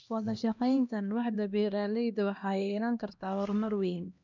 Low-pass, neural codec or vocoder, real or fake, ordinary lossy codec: 7.2 kHz; codec, 16 kHz, 1 kbps, X-Codec, HuBERT features, trained on LibriSpeech; fake; none